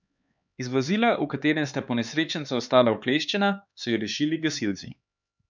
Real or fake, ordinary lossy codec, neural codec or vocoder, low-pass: fake; none; codec, 16 kHz, 4 kbps, X-Codec, HuBERT features, trained on LibriSpeech; 7.2 kHz